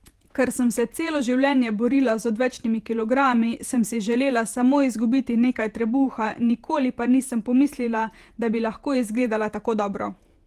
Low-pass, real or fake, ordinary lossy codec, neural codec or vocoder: 14.4 kHz; fake; Opus, 24 kbps; vocoder, 48 kHz, 128 mel bands, Vocos